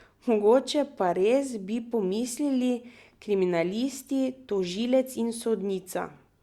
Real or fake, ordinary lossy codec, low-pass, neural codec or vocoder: real; Opus, 64 kbps; 19.8 kHz; none